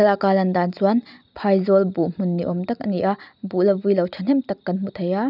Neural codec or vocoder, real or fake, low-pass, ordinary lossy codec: none; real; 5.4 kHz; none